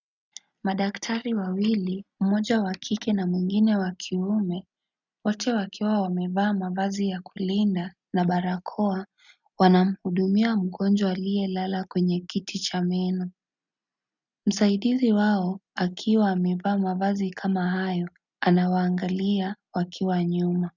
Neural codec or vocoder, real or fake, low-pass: none; real; 7.2 kHz